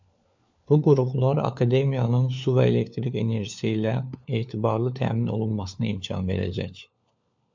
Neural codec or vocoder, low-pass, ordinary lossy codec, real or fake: codec, 16 kHz, 4 kbps, FunCodec, trained on LibriTTS, 50 frames a second; 7.2 kHz; MP3, 64 kbps; fake